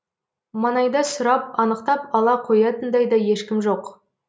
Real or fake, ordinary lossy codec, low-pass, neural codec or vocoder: real; none; none; none